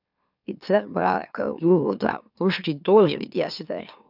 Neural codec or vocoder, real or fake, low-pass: autoencoder, 44.1 kHz, a latent of 192 numbers a frame, MeloTTS; fake; 5.4 kHz